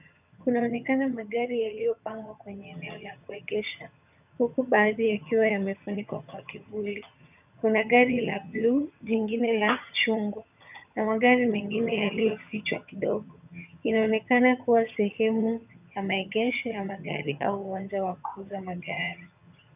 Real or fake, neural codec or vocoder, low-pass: fake; vocoder, 22.05 kHz, 80 mel bands, HiFi-GAN; 3.6 kHz